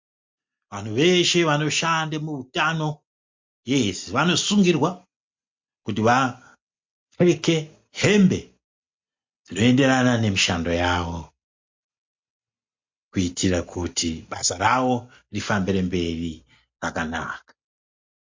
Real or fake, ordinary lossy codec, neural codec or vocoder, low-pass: real; MP3, 48 kbps; none; 7.2 kHz